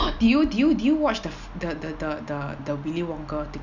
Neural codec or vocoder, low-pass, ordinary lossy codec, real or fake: none; 7.2 kHz; none; real